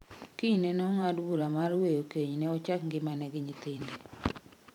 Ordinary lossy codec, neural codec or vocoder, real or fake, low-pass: none; vocoder, 44.1 kHz, 128 mel bands every 512 samples, BigVGAN v2; fake; 19.8 kHz